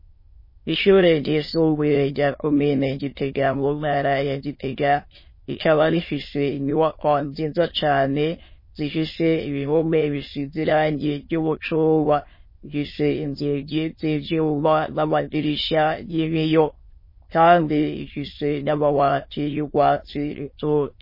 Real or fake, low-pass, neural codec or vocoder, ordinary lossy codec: fake; 5.4 kHz; autoencoder, 22.05 kHz, a latent of 192 numbers a frame, VITS, trained on many speakers; MP3, 24 kbps